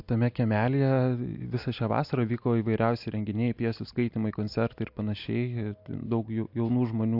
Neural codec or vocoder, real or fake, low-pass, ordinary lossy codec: none; real; 5.4 kHz; AAC, 48 kbps